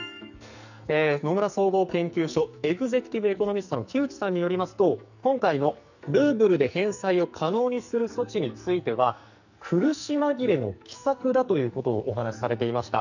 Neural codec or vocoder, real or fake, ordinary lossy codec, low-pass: codec, 44.1 kHz, 2.6 kbps, SNAC; fake; none; 7.2 kHz